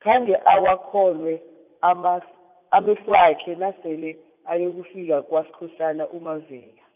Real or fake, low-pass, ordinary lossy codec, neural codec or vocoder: fake; 3.6 kHz; none; vocoder, 44.1 kHz, 80 mel bands, Vocos